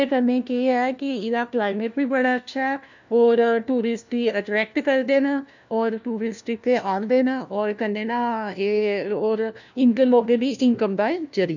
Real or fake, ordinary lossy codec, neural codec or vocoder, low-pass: fake; none; codec, 16 kHz, 1 kbps, FunCodec, trained on LibriTTS, 50 frames a second; 7.2 kHz